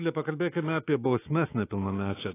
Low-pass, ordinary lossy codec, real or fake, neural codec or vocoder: 3.6 kHz; AAC, 16 kbps; fake; autoencoder, 48 kHz, 128 numbers a frame, DAC-VAE, trained on Japanese speech